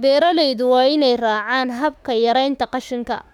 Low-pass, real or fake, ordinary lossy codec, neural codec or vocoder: 19.8 kHz; fake; none; autoencoder, 48 kHz, 32 numbers a frame, DAC-VAE, trained on Japanese speech